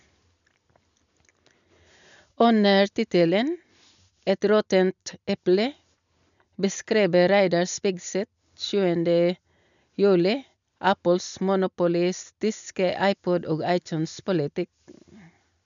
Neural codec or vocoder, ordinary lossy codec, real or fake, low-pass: none; none; real; 7.2 kHz